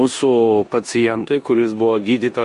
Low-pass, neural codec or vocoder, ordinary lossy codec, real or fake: 10.8 kHz; codec, 16 kHz in and 24 kHz out, 0.9 kbps, LongCat-Audio-Codec, four codebook decoder; MP3, 48 kbps; fake